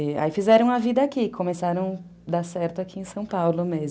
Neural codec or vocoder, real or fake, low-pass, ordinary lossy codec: none; real; none; none